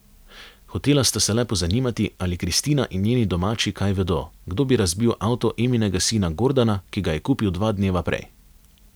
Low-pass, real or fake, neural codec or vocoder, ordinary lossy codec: none; real; none; none